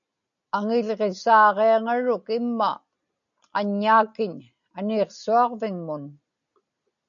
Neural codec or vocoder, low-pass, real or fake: none; 7.2 kHz; real